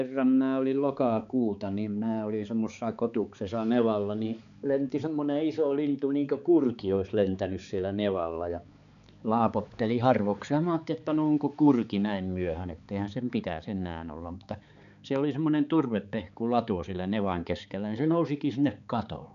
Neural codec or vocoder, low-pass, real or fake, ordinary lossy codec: codec, 16 kHz, 4 kbps, X-Codec, HuBERT features, trained on balanced general audio; 7.2 kHz; fake; none